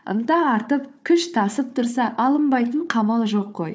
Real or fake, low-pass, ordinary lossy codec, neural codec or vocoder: fake; none; none; codec, 16 kHz, 4 kbps, FunCodec, trained on Chinese and English, 50 frames a second